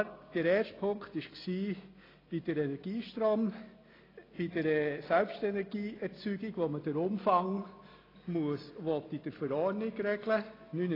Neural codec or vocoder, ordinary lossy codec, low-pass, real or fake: none; AAC, 24 kbps; 5.4 kHz; real